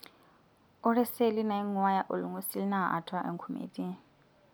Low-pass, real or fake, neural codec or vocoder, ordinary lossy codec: none; real; none; none